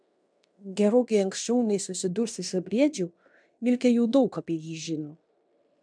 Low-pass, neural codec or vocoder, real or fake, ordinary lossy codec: 9.9 kHz; codec, 16 kHz in and 24 kHz out, 0.9 kbps, LongCat-Audio-Codec, fine tuned four codebook decoder; fake; MP3, 96 kbps